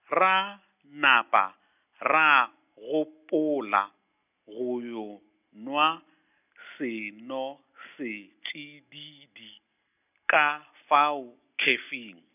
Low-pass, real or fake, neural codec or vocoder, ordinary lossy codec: 3.6 kHz; real; none; none